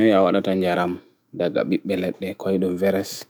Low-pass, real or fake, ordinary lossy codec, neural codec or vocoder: none; fake; none; autoencoder, 48 kHz, 128 numbers a frame, DAC-VAE, trained on Japanese speech